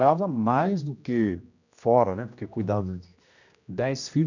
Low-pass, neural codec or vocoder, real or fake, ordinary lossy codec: 7.2 kHz; codec, 16 kHz, 1 kbps, X-Codec, HuBERT features, trained on general audio; fake; none